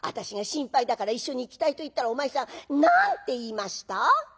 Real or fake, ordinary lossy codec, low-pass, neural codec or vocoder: real; none; none; none